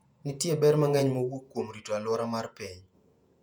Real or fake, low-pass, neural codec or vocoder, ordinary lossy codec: fake; 19.8 kHz; vocoder, 48 kHz, 128 mel bands, Vocos; none